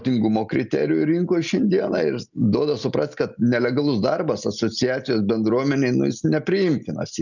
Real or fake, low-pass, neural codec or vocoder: real; 7.2 kHz; none